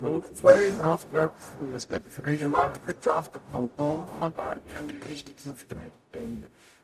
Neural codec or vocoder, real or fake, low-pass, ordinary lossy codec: codec, 44.1 kHz, 0.9 kbps, DAC; fake; 14.4 kHz; AAC, 96 kbps